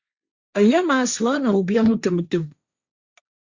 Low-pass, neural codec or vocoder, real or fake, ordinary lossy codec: 7.2 kHz; codec, 16 kHz, 1.1 kbps, Voila-Tokenizer; fake; Opus, 64 kbps